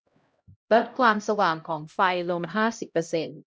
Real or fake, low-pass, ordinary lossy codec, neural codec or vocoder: fake; none; none; codec, 16 kHz, 0.5 kbps, X-Codec, HuBERT features, trained on LibriSpeech